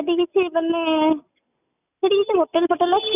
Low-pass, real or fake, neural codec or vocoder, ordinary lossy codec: 3.6 kHz; real; none; none